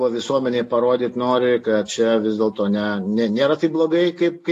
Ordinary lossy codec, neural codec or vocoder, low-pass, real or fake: AAC, 48 kbps; none; 14.4 kHz; real